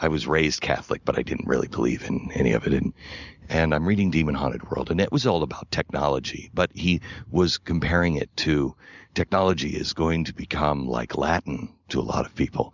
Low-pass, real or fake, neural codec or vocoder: 7.2 kHz; real; none